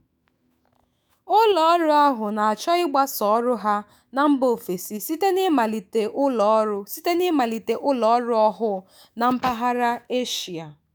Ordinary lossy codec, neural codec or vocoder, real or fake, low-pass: none; autoencoder, 48 kHz, 128 numbers a frame, DAC-VAE, trained on Japanese speech; fake; none